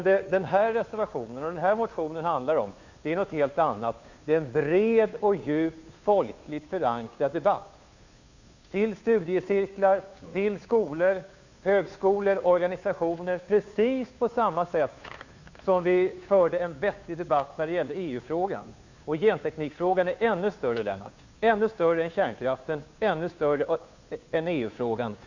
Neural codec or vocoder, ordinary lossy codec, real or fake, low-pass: codec, 16 kHz, 2 kbps, FunCodec, trained on Chinese and English, 25 frames a second; Opus, 64 kbps; fake; 7.2 kHz